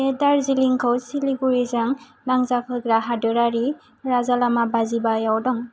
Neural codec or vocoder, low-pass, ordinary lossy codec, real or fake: none; none; none; real